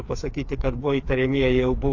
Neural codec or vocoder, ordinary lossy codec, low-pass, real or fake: codec, 16 kHz, 4 kbps, FreqCodec, smaller model; AAC, 48 kbps; 7.2 kHz; fake